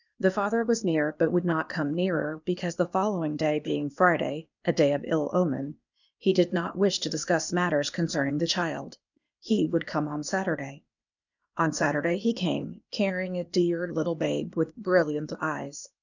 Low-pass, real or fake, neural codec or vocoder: 7.2 kHz; fake; codec, 16 kHz, 0.8 kbps, ZipCodec